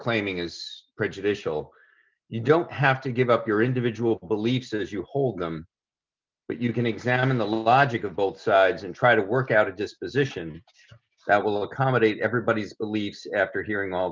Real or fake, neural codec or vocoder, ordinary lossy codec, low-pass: real; none; Opus, 24 kbps; 7.2 kHz